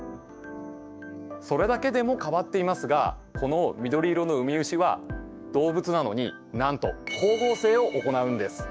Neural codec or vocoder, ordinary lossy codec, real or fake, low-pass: codec, 16 kHz, 6 kbps, DAC; none; fake; none